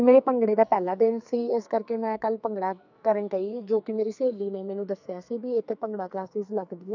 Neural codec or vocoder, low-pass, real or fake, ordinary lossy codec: codec, 44.1 kHz, 2.6 kbps, SNAC; 7.2 kHz; fake; none